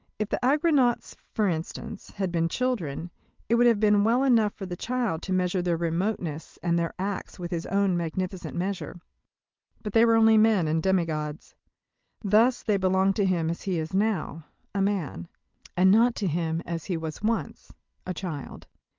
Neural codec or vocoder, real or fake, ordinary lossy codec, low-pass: none; real; Opus, 32 kbps; 7.2 kHz